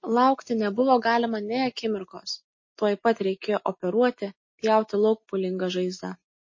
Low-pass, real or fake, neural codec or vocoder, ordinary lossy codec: 7.2 kHz; real; none; MP3, 32 kbps